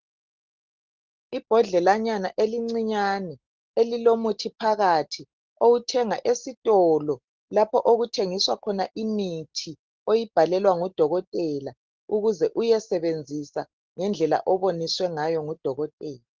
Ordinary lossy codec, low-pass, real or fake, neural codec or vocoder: Opus, 16 kbps; 7.2 kHz; real; none